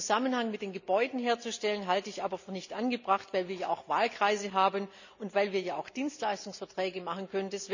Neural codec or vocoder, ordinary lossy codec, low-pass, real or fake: none; none; 7.2 kHz; real